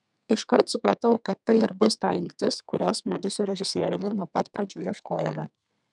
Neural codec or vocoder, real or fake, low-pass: codec, 32 kHz, 1.9 kbps, SNAC; fake; 10.8 kHz